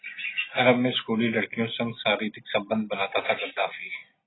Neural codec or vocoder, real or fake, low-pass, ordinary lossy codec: none; real; 7.2 kHz; AAC, 16 kbps